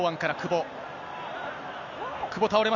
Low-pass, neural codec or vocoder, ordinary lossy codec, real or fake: 7.2 kHz; none; none; real